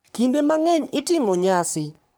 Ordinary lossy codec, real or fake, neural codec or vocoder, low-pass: none; fake; codec, 44.1 kHz, 3.4 kbps, Pupu-Codec; none